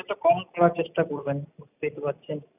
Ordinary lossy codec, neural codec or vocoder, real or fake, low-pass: none; none; real; 3.6 kHz